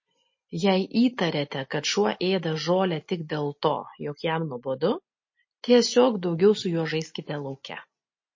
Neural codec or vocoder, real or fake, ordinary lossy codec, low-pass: none; real; MP3, 32 kbps; 7.2 kHz